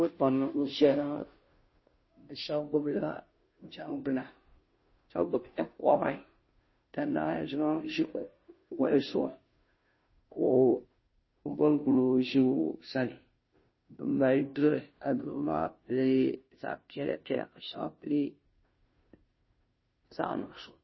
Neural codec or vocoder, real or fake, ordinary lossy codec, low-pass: codec, 16 kHz, 0.5 kbps, FunCodec, trained on Chinese and English, 25 frames a second; fake; MP3, 24 kbps; 7.2 kHz